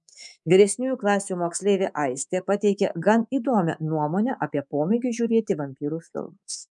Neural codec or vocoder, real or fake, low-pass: codec, 24 kHz, 3.1 kbps, DualCodec; fake; 10.8 kHz